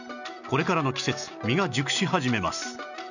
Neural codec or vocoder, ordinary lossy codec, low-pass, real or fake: none; none; 7.2 kHz; real